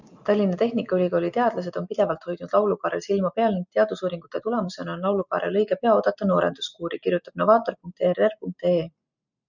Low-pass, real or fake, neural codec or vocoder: 7.2 kHz; real; none